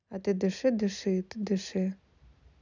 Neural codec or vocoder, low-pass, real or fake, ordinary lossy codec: none; 7.2 kHz; real; none